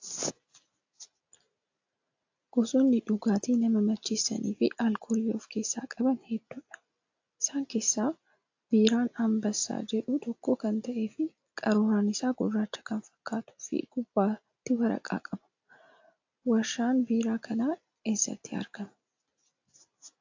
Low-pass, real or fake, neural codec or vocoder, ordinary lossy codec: 7.2 kHz; real; none; AAC, 48 kbps